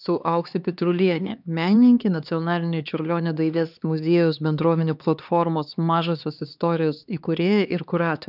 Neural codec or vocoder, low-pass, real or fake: codec, 16 kHz, 2 kbps, X-Codec, HuBERT features, trained on LibriSpeech; 5.4 kHz; fake